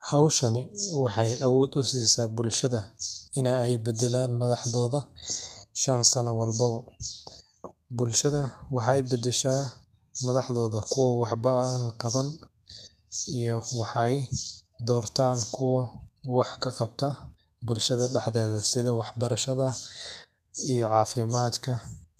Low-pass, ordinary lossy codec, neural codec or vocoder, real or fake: 14.4 kHz; none; codec, 32 kHz, 1.9 kbps, SNAC; fake